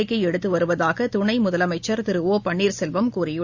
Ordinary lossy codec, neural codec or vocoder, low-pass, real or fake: Opus, 64 kbps; none; 7.2 kHz; real